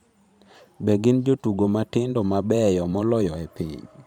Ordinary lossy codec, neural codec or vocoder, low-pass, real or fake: none; vocoder, 44.1 kHz, 128 mel bands every 512 samples, BigVGAN v2; 19.8 kHz; fake